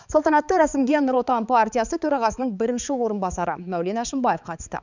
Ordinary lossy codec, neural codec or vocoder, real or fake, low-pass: none; codec, 16 kHz, 4 kbps, X-Codec, HuBERT features, trained on balanced general audio; fake; 7.2 kHz